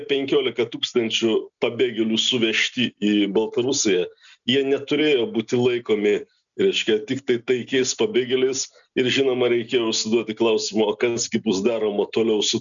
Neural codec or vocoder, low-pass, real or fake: none; 7.2 kHz; real